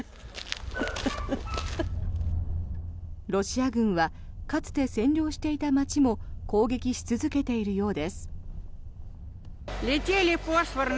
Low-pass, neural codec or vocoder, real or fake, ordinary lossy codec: none; none; real; none